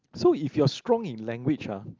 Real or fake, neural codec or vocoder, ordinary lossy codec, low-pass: real; none; Opus, 32 kbps; 7.2 kHz